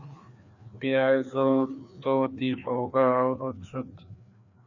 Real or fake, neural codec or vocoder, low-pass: fake; codec, 16 kHz, 2 kbps, FreqCodec, larger model; 7.2 kHz